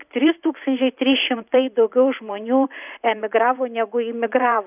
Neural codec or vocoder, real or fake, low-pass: vocoder, 44.1 kHz, 128 mel bands every 512 samples, BigVGAN v2; fake; 3.6 kHz